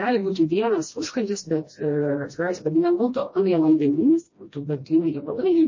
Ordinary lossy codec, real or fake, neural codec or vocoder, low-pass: MP3, 32 kbps; fake; codec, 16 kHz, 1 kbps, FreqCodec, smaller model; 7.2 kHz